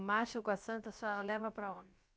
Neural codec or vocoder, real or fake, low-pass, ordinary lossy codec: codec, 16 kHz, about 1 kbps, DyCAST, with the encoder's durations; fake; none; none